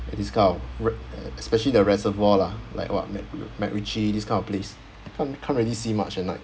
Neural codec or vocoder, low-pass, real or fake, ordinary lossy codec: none; none; real; none